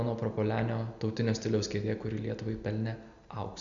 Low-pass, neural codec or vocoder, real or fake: 7.2 kHz; none; real